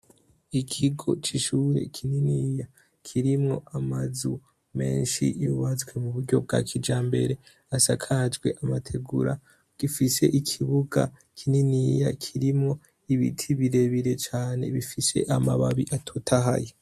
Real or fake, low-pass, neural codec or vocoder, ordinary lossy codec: real; 14.4 kHz; none; MP3, 64 kbps